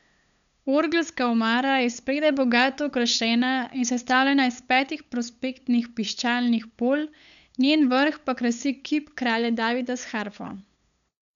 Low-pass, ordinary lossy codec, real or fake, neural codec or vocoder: 7.2 kHz; none; fake; codec, 16 kHz, 8 kbps, FunCodec, trained on LibriTTS, 25 frames a second